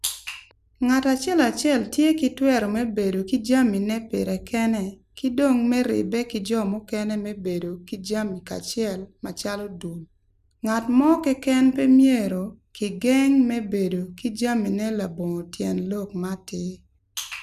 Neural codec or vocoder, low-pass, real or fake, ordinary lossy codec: none; 14.4 kHz; real; none